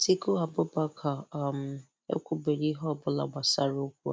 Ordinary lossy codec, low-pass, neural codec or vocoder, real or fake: none; none; none; real